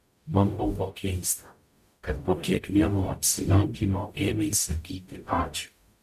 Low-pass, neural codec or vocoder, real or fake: 14.4 kHz; codec, 44.1 kHz, 0.9 kbps, DAC; fake